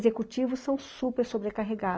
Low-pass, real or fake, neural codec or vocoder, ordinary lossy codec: none; real; none; none